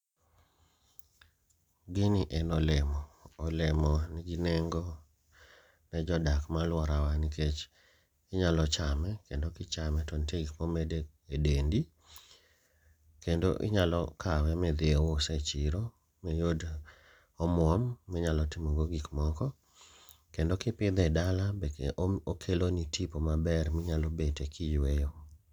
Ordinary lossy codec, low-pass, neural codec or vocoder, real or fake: none; 19.8 kHz; none; real